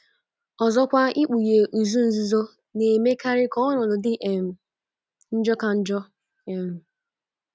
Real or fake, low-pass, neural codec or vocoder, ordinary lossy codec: real; none; none; none